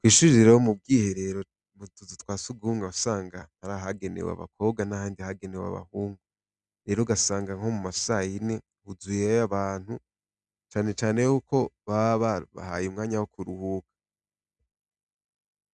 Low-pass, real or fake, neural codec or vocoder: 10.8 kHz; real; none